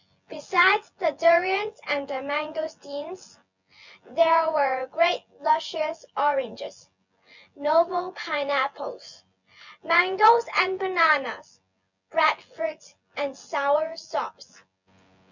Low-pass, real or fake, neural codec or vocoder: 7.2 kHz; fake; vocoder, 24 kHz, 100 mel bands, Vocos